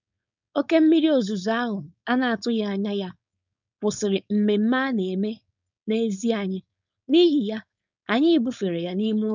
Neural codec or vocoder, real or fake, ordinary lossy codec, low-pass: codec, 16 kHz, 4.8 kbps, FACodec; fake; none; 7.2 kHz